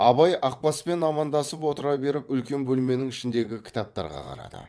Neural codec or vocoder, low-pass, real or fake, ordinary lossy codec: vocoder, 22.05 kHz, 80 mel bands, WaveNeXt; none; fake; none